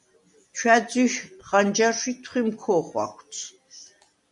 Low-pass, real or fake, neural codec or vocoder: 10.8 kHz; real; none